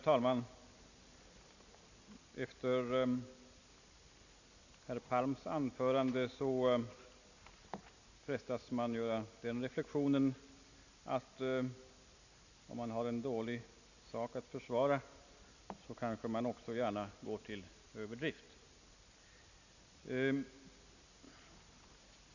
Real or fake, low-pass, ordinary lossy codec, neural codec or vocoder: real; 7.2 kHz; none; none